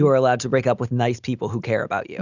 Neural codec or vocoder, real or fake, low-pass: none; real; 7.2 kHz